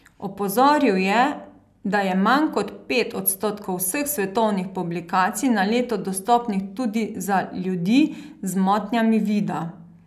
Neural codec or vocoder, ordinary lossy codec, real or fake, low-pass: none; none; real; 14.4 kHz